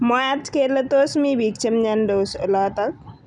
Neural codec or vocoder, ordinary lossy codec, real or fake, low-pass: none; none; real; 10.8 kHz